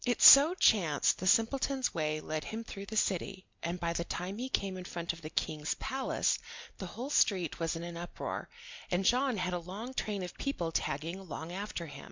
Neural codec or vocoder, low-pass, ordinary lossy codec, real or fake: none; 7.2 kHz; MP3, 64 kbps; real